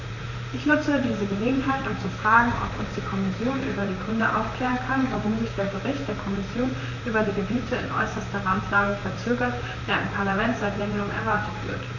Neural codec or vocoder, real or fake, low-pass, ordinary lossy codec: vocoder, 44.1 kHz, 128 mel bands, Pupu-Vocoder; fake; 7.2 kHz; none